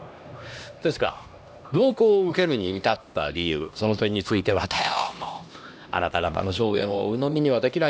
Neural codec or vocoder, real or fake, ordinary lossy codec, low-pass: codec, 16 kHz, 1 kbps, X-Codec, HuBERT features, trained on LibriSpeech; fake; none; none